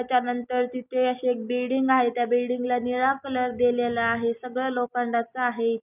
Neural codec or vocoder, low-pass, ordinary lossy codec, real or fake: none; 3.6 kHz; none; real